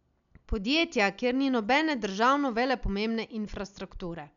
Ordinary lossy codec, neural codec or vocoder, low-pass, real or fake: none; none; 7.2 kHz; real